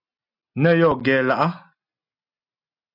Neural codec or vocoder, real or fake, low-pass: none; real; 5.4 kHz